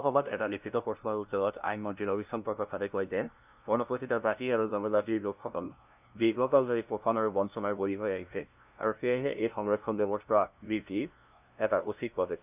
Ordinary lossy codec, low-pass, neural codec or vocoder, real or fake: none; 3.6 kHz; codec, 16 kHz, 0.5 kbps, FunCodec, trained on LibriTTS, 25 frames a second; fake